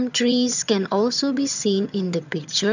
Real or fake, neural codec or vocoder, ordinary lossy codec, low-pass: fake; vocoder, 22.05 kHz, 80 mel bands, HiFi-GAN; none; 7.2 kHz